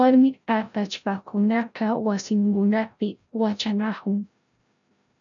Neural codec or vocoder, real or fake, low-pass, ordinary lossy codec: codec, 16 kHz, 0.5 kbps, FreqCodec, larger model; fake; 7.2 kHz; MP3, 96 kbps